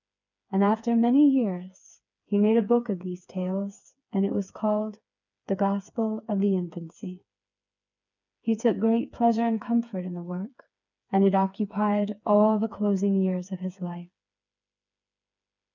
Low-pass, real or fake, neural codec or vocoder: 7.2 kHz; fake; codec, 16 kHz, 4 kbps, FreqCodec, smaller model